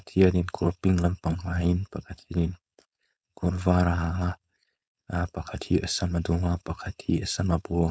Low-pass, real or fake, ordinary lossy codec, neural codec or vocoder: none; fake; none; codec, 16 kHz, 4.8 kbps, FACodec